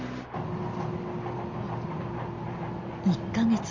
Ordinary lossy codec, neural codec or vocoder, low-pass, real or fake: Opus, 32 kbps; none; 7.2 kHz; real